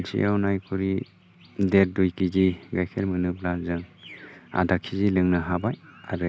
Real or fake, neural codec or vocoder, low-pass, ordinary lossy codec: real; none; none; none